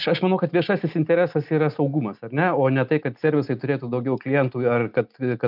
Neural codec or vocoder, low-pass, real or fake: none; 5.4 kHz; real